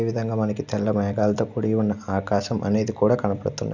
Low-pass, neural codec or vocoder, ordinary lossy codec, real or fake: 7.2 kHz; none; none; real